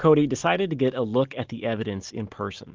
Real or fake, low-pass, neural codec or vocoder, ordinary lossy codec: real; 7.2 kHz; none; Opus, 32 kbps